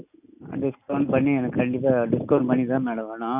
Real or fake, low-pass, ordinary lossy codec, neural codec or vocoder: real; 3.6 kHz; none; none